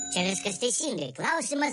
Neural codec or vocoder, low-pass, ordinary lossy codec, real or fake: none; 14.4 kHz; MP3, 48 kbps; real